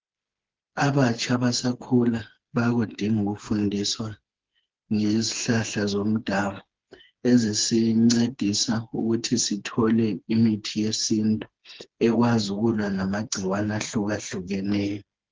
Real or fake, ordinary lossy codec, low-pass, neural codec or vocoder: fake; Opus, 16 kbps; 7.2 kHz; codec, 16 kHz, 4 kbps, FreqCodec, smaller model